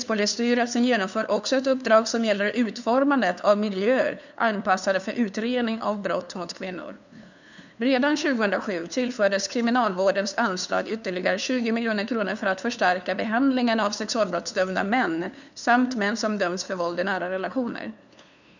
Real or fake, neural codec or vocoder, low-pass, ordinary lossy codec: fake; codec, 16 kHz, 2 kbps, FunCodec, trained on LibriTTS, 25 frames a second; 7.2 kHz; none